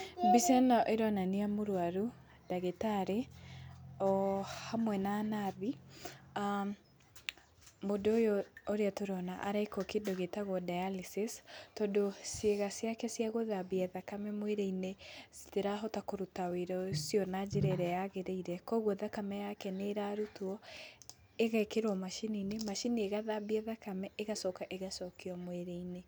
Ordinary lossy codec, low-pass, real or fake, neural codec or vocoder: none; none; real; none